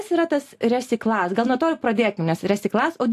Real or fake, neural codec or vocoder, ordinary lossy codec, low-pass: real; none; AAC, 64 kbps; 14.4 kHz